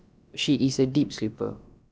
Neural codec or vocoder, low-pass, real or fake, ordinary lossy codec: codec, 16 kHz, about 1 kbps, DyCAST, with the encoder's durations; none; fake; none